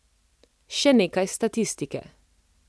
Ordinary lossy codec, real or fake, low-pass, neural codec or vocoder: none; real; none; none